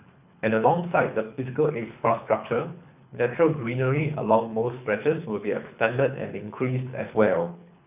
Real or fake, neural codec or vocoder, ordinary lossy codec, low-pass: fake; codec, 24 kHz, 3 kbps, HILCodec; none; 3.6 kHz